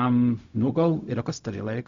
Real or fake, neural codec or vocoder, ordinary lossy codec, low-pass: fake; codec, 16 kHz, 0.4 kbps, LongCat-Audio-Codec; Opus, 64 kbps; 7.2 kHz